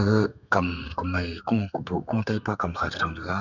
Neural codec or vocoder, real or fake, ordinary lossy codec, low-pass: codec, 32 kHz, 1.9 kbps, SNAC; fake; none; 7.2 kHz